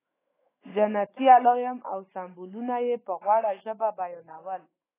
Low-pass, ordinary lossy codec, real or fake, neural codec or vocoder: 3.6 kHz; AAC, 16 kbps; fake; autoencoder, 48 kHz, 128 numbers a frame, DAC-VAE, trained on Japanese speech